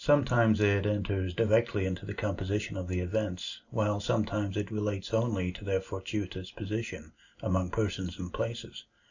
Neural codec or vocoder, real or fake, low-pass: none; real; 7.2 kHz